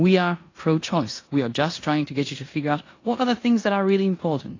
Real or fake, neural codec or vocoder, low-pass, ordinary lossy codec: fake; codec, 16 kHz in and 24 kHz out, 0.9 kbps, LongCat-Audio-Codec, four codebook decoder; 7.2 kHz; AAC, 32 kbps